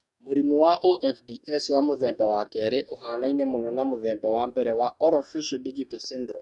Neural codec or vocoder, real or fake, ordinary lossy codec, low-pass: codec, 44.1 kHz, 2.6 kbps, DAC; fake; none; 10.8 kHz